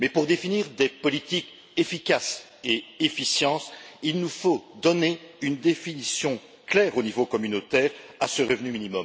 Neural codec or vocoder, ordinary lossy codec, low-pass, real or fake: none; none; none; real